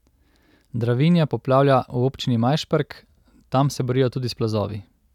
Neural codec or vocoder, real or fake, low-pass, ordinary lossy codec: none; real; 19.8 kHz; none